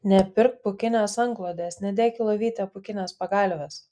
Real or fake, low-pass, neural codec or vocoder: real; 9.9 kHz; none